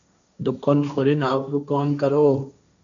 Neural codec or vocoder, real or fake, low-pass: codec, 16 kHz, 1.1 kbps, Voila-Tokenizer; fake; 7.2 kHz